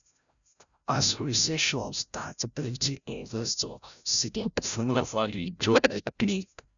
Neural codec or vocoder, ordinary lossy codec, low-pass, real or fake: codec, 16 kHz, 0.5 kbps, FreqCodec, larger model; none; 7.2 kHz; fake